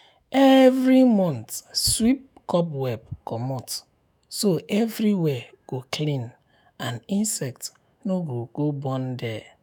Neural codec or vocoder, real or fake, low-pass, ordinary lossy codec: autoencoder, 48 kHz, 128 numbers a frame, DAC-VAE, trained on Japanese speech; fake; none; none